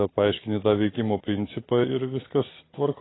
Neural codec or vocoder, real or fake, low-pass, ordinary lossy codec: none; real; 7.2 kHz; AAC, 16 kbps